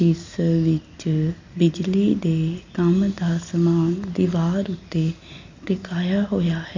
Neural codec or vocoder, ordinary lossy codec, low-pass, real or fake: none; MP3, 64 kbps; 7.2 kHz; real